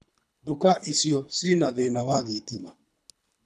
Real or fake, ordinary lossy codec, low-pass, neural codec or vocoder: fake; none; none; codec, 24 kHz, 3 kbps, HILCodec